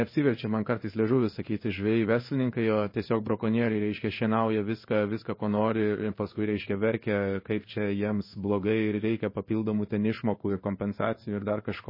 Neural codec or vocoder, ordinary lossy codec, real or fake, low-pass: codec, 16 kHz in and 24 kHz out, 1 kbps, XY-Tokenizer; MP3, 24 kbps; fake; 5.4 kHz